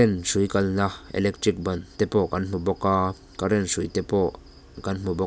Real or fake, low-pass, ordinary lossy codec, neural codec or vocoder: real; none; none; none